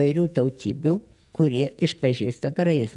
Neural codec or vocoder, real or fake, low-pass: codec, 44.1 kHz, 2.6 kbps, SNAC; fake; 10.8 kHz